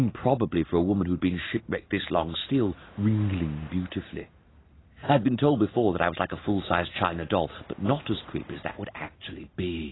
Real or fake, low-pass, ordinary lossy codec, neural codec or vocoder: real; 7.2 kHz; AAC, 16 kbps; none